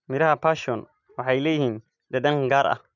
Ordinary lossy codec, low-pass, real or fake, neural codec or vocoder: none; 7.2 kHz; real; none